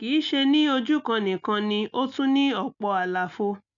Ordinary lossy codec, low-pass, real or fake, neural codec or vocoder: none; 7.2 kHz; real; none